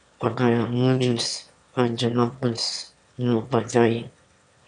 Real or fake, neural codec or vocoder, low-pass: fake; autoencoder, 22.05 kHz, a latent of 192 numbers a frame, VITS, trained on one speaker; 9.9 kHz